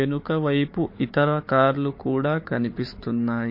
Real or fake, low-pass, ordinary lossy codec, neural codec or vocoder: fake; 5.4 kHz; MP3, 32 kbps; codec, 16 kHz, 4 kbps, FunCodec, trained on Chinese and English, 50 frames a second